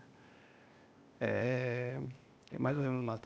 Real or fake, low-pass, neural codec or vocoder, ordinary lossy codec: fake; none; codec, 16 kHz, 0.8 kbps, ZipCodec; none